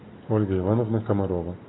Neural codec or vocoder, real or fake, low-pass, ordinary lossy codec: none; real; 7.2 kHz; AAC, 16 kbps